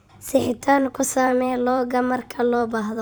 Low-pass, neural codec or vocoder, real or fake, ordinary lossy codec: none; vocoder, 44.1 kHz, 128 mel bands every 512 samples, BigVGAN v2; fake; none